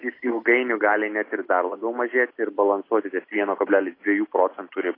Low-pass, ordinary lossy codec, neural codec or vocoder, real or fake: 5.4 kHz; AAC, 24 kbps; none; real